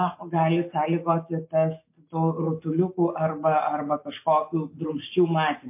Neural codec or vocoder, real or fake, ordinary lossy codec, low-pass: none; real; MP3, 24 kbps; 3.6 kHz